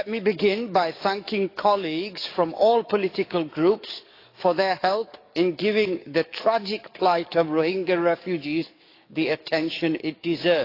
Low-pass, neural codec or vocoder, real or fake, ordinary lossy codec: 5.4 kHz; codec, 44.1 kHz, 7.8 kbps, DAC; fake; AAC, 32 kbps